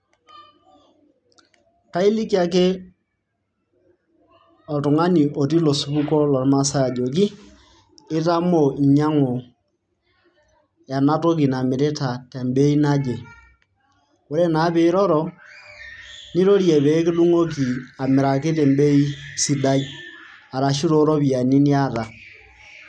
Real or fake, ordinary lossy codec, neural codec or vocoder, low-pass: real; none; none; 9.9 kHz